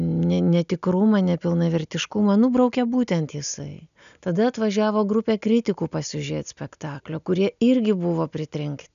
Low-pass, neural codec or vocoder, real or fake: 7.2 kHz; none; real